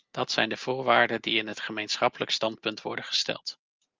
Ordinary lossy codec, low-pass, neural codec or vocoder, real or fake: Opus, 32 kbps; 7.2 kHz; none; real